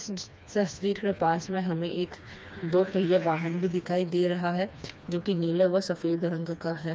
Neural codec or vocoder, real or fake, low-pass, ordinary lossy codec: codec, 16 kHz, 2 kbps, FreqCodec, smaller model; fake; none; none